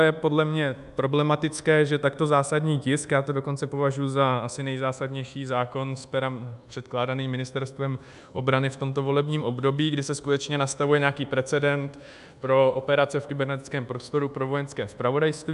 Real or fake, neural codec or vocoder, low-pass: fake; codec, 24 kHz, 1.2 kbps, DualCodec; 10.8 kHz